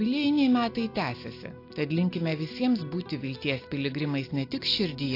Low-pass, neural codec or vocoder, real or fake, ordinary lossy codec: 5.4 kHz; none; real; AAC, 32 kbps